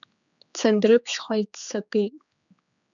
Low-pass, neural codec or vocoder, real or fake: 7.2 kHz; codec, 16 kHz, 4 kbps, X-Codec, HuBERT features, trained on general audio; fake